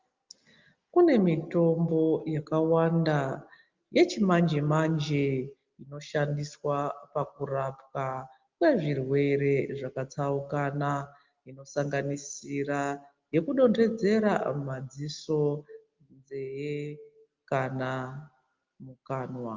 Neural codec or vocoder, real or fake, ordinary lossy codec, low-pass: none; real; Opus, 24 kbps; 7.2 kHz